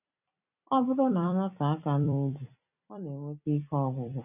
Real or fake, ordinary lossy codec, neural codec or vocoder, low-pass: fake; none; vocoder, 44.1 kHz, 128 mel bands every 256 samples, BigVGAN v2; 3.6 kHz